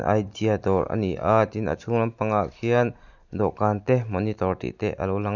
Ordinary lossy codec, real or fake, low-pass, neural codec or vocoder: none; real; 7.2 kHz; none